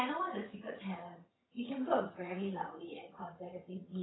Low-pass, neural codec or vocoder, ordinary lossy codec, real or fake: 7.2 kHz; vocoder, 22.05 kHz, 80 mel bands, HiFi-GAN; AAC, 16 kbps; fake